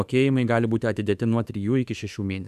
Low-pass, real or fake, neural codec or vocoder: 14.4 kHz; fake; autoencoder, 48 kHz, 32 numbers a frame, DAC-VAE, trained on Japanese speech